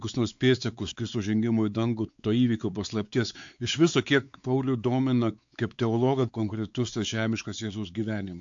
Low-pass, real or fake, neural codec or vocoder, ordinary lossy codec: 7.2 kHz; fake; codec, 16 kHz, 4 kbps, X-Codec, WavLM features, trained on Multilingual LibriSpeech; AAC, 64 kbps